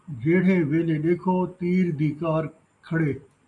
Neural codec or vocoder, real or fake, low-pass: none; real; 10.8 kHz